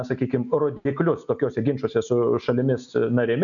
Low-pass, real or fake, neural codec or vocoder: 7.2 kHz; real; none